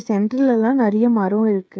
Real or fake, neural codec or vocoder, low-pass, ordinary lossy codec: fake; codec, 16 kHz, 16 kbps, FreqCodec, smaller model; none; none